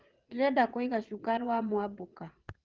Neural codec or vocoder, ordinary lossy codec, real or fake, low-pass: vocoder, 22.05 kHz, 80 mel bands, Vocos; Opus, 16 kbps; fake; 7.2 kHz